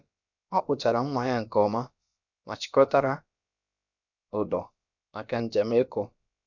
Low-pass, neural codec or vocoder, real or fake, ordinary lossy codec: 7.2 kHz; codec, 16 kHz, about 1 kbps, DyCAST, with the encoder's durations; fake; none